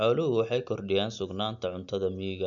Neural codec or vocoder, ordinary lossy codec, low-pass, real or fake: none; none; 7.2 kHz; real